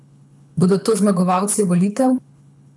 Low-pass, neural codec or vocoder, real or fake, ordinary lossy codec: none; codec, 24 kHz, 6 kbps, HILCodec; fake; none